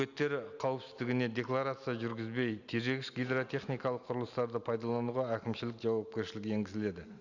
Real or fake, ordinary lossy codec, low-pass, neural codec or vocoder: real; none; 7.2 kHz; none